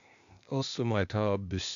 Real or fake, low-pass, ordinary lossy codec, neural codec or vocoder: fake; 7.2 kHz; AAC, 96 kbps; codec, 16 kHz, 0.8 kbps, ZipCodec